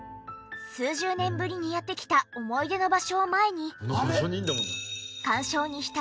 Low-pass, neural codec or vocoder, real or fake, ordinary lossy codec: none; none; real; none